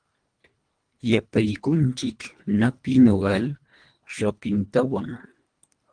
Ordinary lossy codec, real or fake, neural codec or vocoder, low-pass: Opus, 32 kbps; fake; codec, 24 kHz, 1.5 kbps, HILCodec; 9.9 kHz